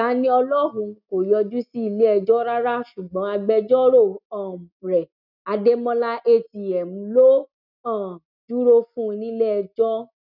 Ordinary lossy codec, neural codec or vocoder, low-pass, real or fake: none; none; 5.4 kHz; real